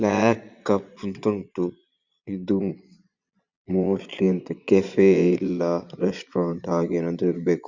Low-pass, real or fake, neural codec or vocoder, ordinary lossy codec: 7.2 kHz; fake; vocoder, 22.05 kHz, 80 mel bands, Vocos; Opus, 64 kbps